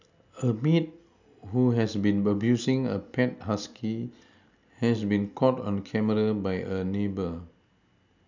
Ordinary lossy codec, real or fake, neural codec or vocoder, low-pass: none; real; none; 7.2 kHz